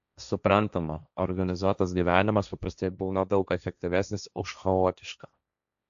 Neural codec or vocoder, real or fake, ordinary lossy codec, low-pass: codec, 16 kHz, 1.1 kbps, Voila-Tokenizer; fake; AAC, 96 kbps; 7.2 kHz